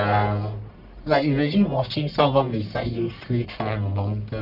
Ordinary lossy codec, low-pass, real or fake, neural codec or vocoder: none; 5.4 kHz; fake; codec, 44.1 kHz, 1.7 kbps, Pupu-Codec